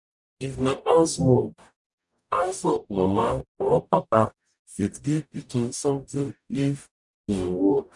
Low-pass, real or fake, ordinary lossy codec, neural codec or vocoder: 10.8 kHz; fake; none; codec, 44.1 kHz, 0.9 kbps, DAC